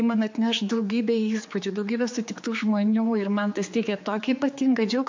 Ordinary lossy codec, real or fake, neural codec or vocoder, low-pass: MP3, 48 kbps; fake; codec, 16 kHz, 4 kbps, X-Codec, HuBERT features, trained on general audio; 7.2 kHz